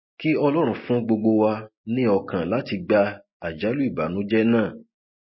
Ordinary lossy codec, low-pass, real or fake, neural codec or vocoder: MP3, 24 kbps; 7.2 kHz; real; none